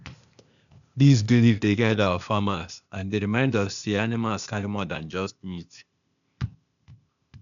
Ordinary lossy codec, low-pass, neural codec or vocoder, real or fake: none; 7.2 kHz; codec, 16 kHz, 0.8 kbps, ZipCodec; fake